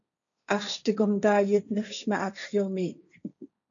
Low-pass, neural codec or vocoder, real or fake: 7.2 kHz; codec, 16 kHz, 1.1 kbps, Voila-Tokenizer; fake